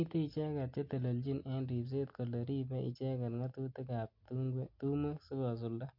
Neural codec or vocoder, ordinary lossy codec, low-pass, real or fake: none; MP3, 48 kbps; 5.4 kHz; real